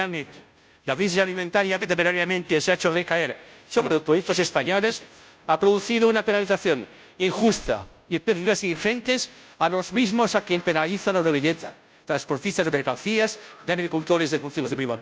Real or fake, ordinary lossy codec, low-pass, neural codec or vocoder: fake; none; none; codec, 16 kHz, 0.5 kbps, FunCodec, trained on Chinese and English, 25 frames a second